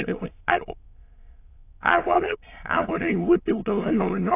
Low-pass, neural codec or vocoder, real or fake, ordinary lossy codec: 3.6 kHz; autoencoder, 22.05 kHz, a latent of 192 numbers a frame, VITS, trained on many speakers; fake; AAC, 24 kbps